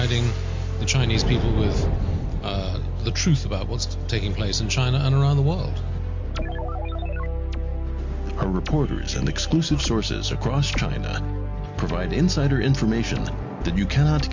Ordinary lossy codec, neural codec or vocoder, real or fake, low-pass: MP3, 48 kbps; none; real; 7.2 kHz